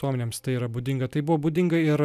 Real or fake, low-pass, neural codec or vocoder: real; 14.4 kHz; none